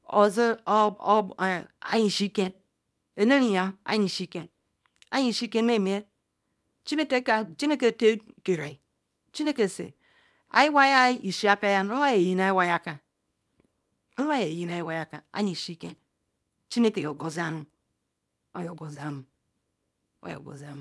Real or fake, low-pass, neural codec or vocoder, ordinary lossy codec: fake; none; codec, 24 kHz, 0.9 kbps, WavTokenizer, small release; none